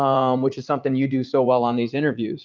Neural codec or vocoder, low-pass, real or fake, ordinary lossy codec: vocoder, 44.1 kHz, 80 mel bands, Vocos; 7.2 kHz; fake; Opus, 24 kbps